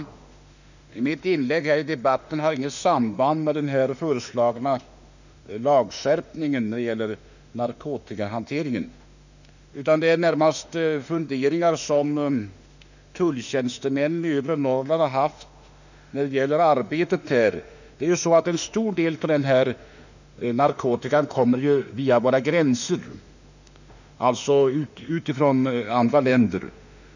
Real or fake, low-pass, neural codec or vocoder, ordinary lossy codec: fake; 7.2 kHz; autoencoder, 48 kHz, 32 numbers a frame, DAC-VAE, trained on Japanese speech; none